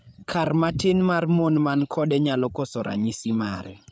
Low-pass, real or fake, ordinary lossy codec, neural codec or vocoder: none; fake; none; codec, 16 kHz, 8 kbps, FreqCodec, larger model